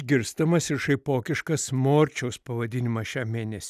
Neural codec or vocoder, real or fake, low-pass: none; real; 14.4 kHz